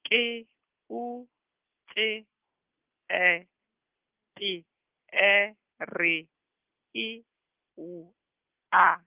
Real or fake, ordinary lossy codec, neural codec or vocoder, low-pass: real; Opus, 32 kbps; none; 3.6 kHz